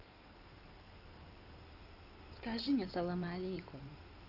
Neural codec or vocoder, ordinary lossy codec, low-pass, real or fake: codec, 16 kHz in and 24 kHz out, 2.2 kbps, FireRedTTS-2 codec; none; 5.4 kHz; fake